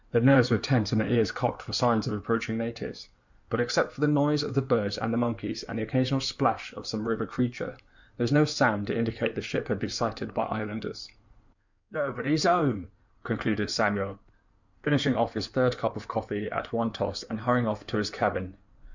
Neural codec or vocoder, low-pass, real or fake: codec, 16 kHz in and 24 kHz out, 2.2 kbps, FireRedTTS-2 codec; 7.2 kHz; fake